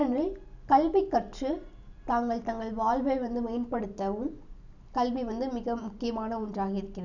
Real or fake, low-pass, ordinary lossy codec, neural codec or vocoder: real; 7.2 kHz; none; none